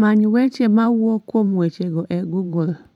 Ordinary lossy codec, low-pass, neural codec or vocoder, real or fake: none; 19.8 kHz; vocoder, 44.1 kHz, 128 mel bands every 512 samples, BigVGAN v2; fake